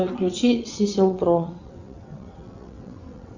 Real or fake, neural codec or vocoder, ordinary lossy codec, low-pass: fake; vocoder, 22.05 kHz, 80 mel bands, WaveNeXt; Opus, 64 kbps; 7.2 kHz